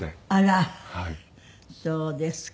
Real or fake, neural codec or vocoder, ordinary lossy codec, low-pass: real; none; none; none